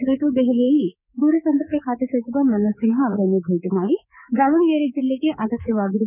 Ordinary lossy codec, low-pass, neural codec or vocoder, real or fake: none; 3.6 kHz; codec, 24 kHz, 3.1 kbps, DualCodec; fake